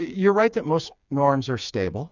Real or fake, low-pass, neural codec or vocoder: fake; 7.2 kHz; codec, 16 kHz, 4 kbps, FreqCodec, smaller model